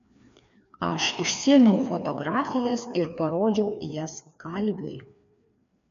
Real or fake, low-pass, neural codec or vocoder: fake; 7.2 kHz; codec, 16 kHz, 2 kbps, FreqCodec, larger model